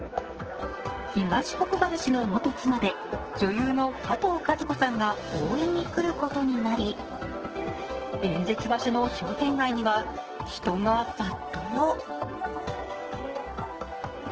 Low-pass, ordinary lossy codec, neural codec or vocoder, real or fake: 7.2 kHz; Opus, 16 kbps; codec, 44.1 kHz, 2.6 kbps, SNAC; fake